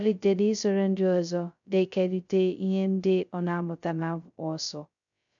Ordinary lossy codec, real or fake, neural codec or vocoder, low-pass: none; fake; codec, 16 kHz, 0.2 kbps, FocalCodec; 7.2 kHz